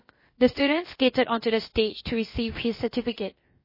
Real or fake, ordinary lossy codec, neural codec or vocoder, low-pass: fake; MP3, 24 kbps; codec, 16 kHz, about 1 kbps, DyCAST, with the encoder's durations; 5.4 kHz